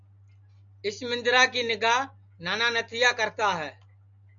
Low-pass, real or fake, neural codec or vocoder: 7.2 kHz; real; none